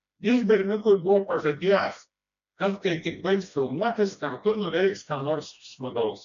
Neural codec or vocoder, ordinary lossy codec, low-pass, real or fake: codec, 16 kHz, 1 kbps, FreqCodec, smaller model; AAC, 96 kbps; 7.2 kHz; fake